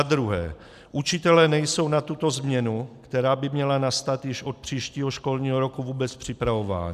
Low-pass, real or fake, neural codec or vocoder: 14.4 kHz; real; none